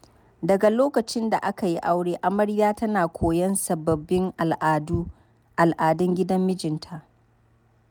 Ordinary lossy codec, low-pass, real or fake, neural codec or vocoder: none; none; real; none